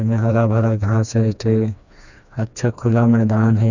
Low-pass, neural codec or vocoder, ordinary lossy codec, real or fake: 7.2 kHz; codec, 16 kHz, 2 kbps, FreqCodec, smaller model; none; fake